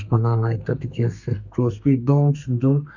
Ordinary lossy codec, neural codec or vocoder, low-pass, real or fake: none; codec, 32 kHz, 1.9 kbps, SNAC; 7.2 kHz; fake